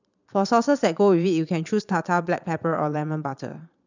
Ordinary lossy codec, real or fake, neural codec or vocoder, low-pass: none; fake; vocoder, 22.05 kHz, 80 mel bands, Vocos; 7.2 kHz